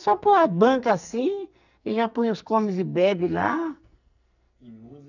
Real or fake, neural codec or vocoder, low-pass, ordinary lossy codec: fake; codec, 44.1 kHz, 2.6 kbps, SNAC; 7.2 kHz; none